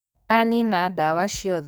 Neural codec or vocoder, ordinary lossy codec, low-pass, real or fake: codec, 44.1 kHz, 2.6 kbps, SNAC; none; none; fake